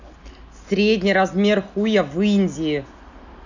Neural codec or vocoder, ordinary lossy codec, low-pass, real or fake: none; none; 7.2 kHz; real